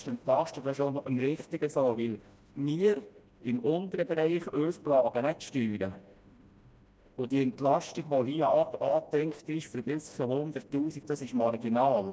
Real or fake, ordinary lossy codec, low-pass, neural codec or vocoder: fake; none; none; codec, 16 kHz, 1 kbps, FreqCodec, smaller model